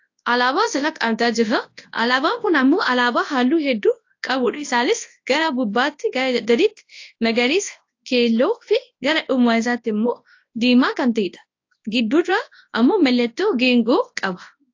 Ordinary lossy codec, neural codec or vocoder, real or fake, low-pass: AAC, 48 kbps; codec, 24 kHz, 0.9 kbps, WavTokenizer, large speech release; fake; 7.2 kHz